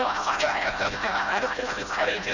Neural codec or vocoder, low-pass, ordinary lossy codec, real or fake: codec, 16 kHz, 0.5 kbps, FreqCodec, smaller model; 7.2 kHz; none; fake